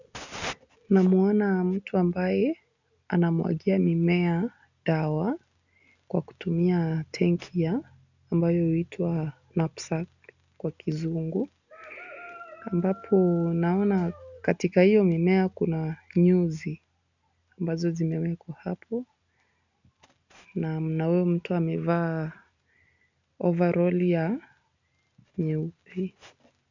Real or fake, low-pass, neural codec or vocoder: real; 7.2 kHz; none